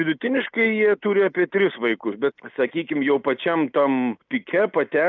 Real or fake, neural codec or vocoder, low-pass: real; none; 7.2 kHz